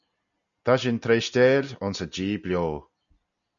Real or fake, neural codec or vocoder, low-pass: real; none; 7.2 kHz